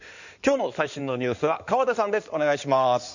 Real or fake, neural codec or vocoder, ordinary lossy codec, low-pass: fake; codec, 16 kHz in and 24 kHz out, 2.2 kbps, FireRedTTS-2 codec; none; 7.2 kHz